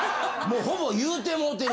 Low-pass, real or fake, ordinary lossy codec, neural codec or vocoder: none; real; none; none